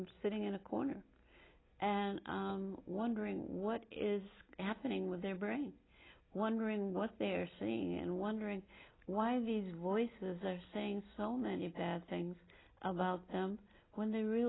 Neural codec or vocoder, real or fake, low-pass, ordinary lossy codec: none; real; 7.2 kHz; AAC, 16 kbps